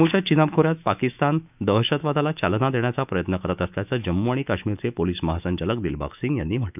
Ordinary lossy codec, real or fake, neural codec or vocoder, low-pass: none; fake; autoencoder, 48 kHz, 128 numbers a frame, DAC-VAE, trained on Japanese speech; 3.6 kHz